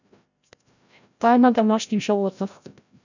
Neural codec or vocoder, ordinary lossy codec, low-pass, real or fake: codec, 16 kHz, 0.5 kbps, FreqCodec, larger model; none; 7.2 kHz; fake